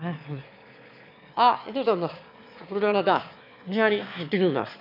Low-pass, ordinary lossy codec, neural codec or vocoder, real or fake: 5.4 kHz; none; autoencoder, 22.05 kHz, a latent of 192 numbers a frame, VITS, trained on one speaker; fake